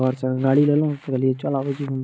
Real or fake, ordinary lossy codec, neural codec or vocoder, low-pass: real; none; none; none